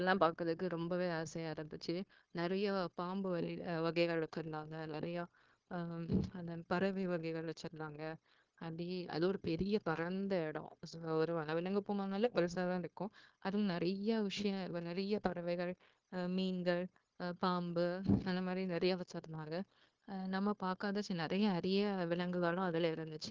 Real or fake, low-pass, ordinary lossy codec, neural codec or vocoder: fake; 7.2 kHz; Opus, 16 kbps; codec, 16 kHz, 0.9 kbps, LongCat-Audio-Codec